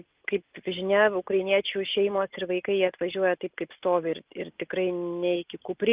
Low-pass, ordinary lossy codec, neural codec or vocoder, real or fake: 3.6 kHz; Opus, 24 kbps; none; real